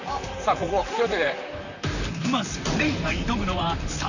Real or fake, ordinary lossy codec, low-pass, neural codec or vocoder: fake; none; 7.2 kHz; vocoder, 44.1 kHz, 128 mel bands, Pupu-Vocoder